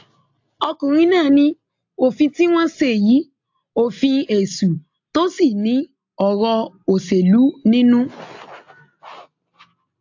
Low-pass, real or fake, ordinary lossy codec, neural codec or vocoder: 7.2 kHz; real; AAC, 48 kbps; none